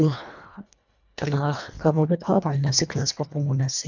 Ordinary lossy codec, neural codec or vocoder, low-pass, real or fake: none; codec, 24 kHz, 1.5 kbps, HILCodec; 7.2 kHz; fake